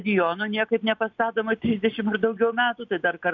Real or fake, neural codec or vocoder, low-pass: real; none; 7.2 kHz